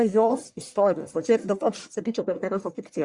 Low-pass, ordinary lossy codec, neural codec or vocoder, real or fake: 10.8 kHz; Opus, 64 kbps; codec, 44.1 kHz, 1.7 kbps, Pupu-Codec; fake